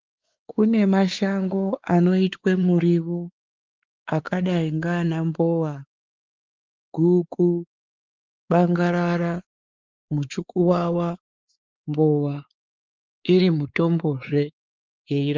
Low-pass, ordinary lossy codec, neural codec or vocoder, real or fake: 7.2 kHz; Opus, 32 kbps; codec, 16 kHz, 4 kbps, X-Codec, WavLM features, trained on Multilingual LibriSpeech; fake